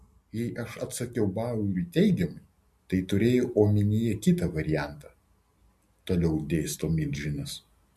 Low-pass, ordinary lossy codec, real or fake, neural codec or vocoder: 14.4 kHz; MP3, 64 kbps; real; none